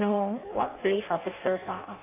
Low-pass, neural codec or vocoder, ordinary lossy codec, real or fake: 3.6 kHz; codec, 16 kHz in and 24 kHz out, 0.6 kbps, FireRedTTS-2 codec; AAC, 24 kbps; fake